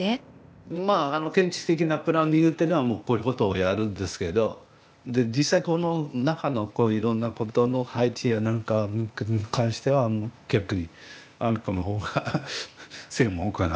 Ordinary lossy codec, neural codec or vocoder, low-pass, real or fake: none; codec, 16 kHz, 0.8 kbps, ZipCodec; none; fake